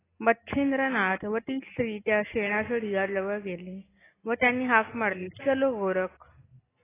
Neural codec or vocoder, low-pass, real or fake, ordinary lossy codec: none; 3.6 kHz; real; AAC, 16 kbps